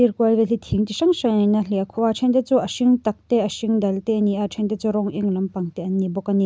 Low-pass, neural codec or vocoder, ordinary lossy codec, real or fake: none; none; none; real